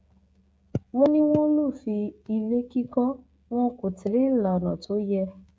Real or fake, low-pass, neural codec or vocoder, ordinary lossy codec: fake; none; codec, 16 kHz, 16 kbps, FreqCodec, smaller model; none